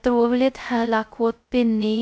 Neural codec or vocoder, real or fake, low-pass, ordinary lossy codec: codec, 16 kHz, 0.2 kbps, FocalCodec; fake; none; none